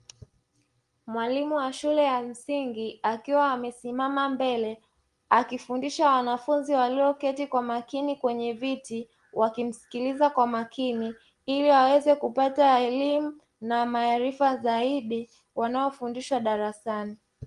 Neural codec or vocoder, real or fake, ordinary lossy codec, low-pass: none; real; Opus, 24 kbps; 10.8 kHz